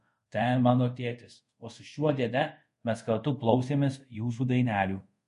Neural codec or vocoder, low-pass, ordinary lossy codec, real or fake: codec, 24 kHz, 0.5 kbps, DualCodec; 10.8 kHz; MP3, 48 kbps; fake